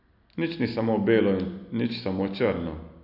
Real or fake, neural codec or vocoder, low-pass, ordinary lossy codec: real; none; 5.4 kHz; none